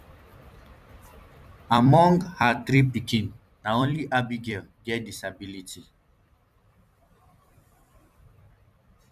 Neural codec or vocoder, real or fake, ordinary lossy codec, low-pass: vocoder, 44.1 kHz, 128 mel bands every 256 samples, BigVGAN v2; fake; none; 14.4 kHz